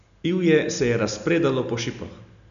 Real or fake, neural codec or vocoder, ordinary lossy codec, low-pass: real; none; none; 7.2 kHz